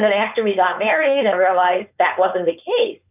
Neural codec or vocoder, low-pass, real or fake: codec, 16 kHz, 4.8 kbps, FACodec; 3.6 kHz; fake